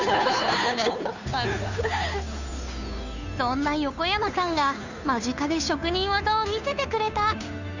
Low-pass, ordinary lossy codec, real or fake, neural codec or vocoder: 7.2 kHz; none; fake; codec, 16 kHz, 2 kbps, FunCodec, trained on Chinese and English, 25 frames a second